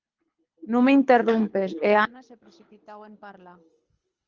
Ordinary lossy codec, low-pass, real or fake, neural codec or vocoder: Opus, 24 kbps; 7.2 kHz; fake; codec, 24 kHz, 6 kbps, HILCodec